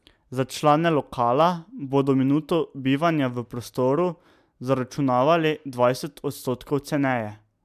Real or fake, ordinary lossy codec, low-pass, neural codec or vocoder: real; MP3, 96 kbps; 14.4 kHz; none